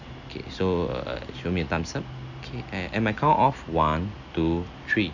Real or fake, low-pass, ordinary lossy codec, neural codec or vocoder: real; 7.2 kHz; none; none